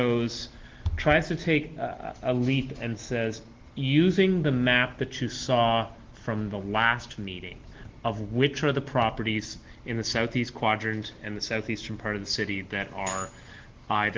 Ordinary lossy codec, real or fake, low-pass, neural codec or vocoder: Opus, 16 kbps; real; 7.2 kHz; none